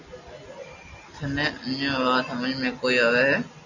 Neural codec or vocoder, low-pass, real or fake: none; 7.2 kHz; real